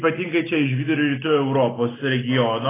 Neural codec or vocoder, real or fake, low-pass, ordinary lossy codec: none; real; 3.6 kHz; AAC, 16 kbps